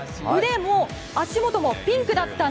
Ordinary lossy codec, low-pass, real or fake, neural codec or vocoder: none; none; real; none